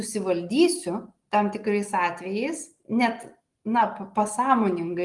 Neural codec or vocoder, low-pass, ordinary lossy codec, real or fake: none; 10.8 kHz; Opus, 24 kbps; real